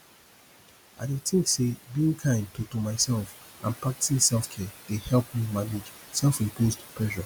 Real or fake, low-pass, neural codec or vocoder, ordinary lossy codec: real; none; none; none